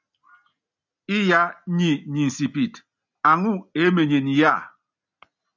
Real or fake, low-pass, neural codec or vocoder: real; 7.2 kHz; none